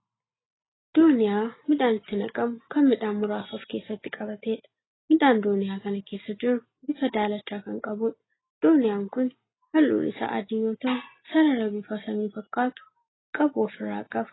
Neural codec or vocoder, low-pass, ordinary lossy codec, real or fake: none; 7.2 kHz; AAC, 16 kbps; real